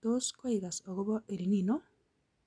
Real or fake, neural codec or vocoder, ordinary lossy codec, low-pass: real; none; none; 9.9 kHz